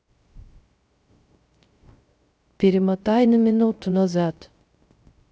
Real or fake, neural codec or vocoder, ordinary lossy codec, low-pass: fake; codec, 16 kHz, 0.3 kbps, FocalCodec; none; none